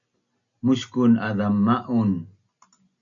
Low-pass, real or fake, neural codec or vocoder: 7.2 kHz; real; none